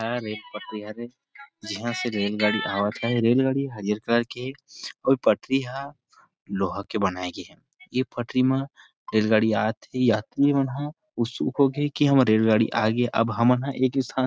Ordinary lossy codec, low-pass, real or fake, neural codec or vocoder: none; none; real; none